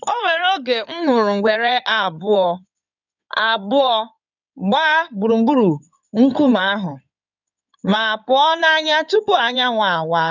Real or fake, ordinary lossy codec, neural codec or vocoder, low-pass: fake; none; codec, 16 kHz in and 24 kHz out, 2.2 kbps, FireRedTTS-2 codec; 7.2 kHz